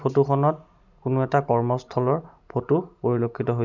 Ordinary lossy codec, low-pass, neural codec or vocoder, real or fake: none; 7.2 kHz; none; real